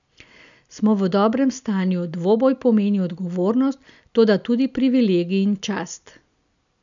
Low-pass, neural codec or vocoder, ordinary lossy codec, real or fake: 7.2 kHz; none; none; real